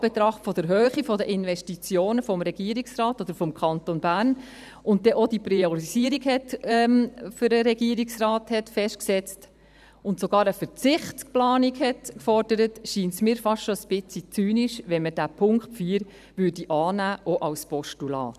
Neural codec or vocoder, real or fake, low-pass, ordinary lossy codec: vocoder, 44.1 kHz, 128 mel bands every 512 samples, BigVGAN v2; fake; 14.4 kHz; none